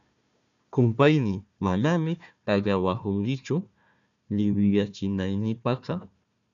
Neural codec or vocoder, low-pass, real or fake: codec, 16 kHz, 1 kbps, FunCodec, trained on Chinese and English, 50 frames a second; 7.2 kHz; fake